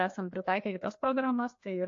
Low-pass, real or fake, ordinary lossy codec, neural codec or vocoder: 7.2 kHz; fake; AAC, 48 kbps; codec, 16 kHz, 2 kbps, FreqCodec, larger model